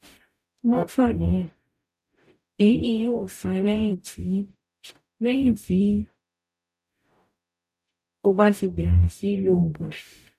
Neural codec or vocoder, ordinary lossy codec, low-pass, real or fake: codec, 44.1 kHz, 0.9 kbps, DAC; none; 14.4 kHz; fake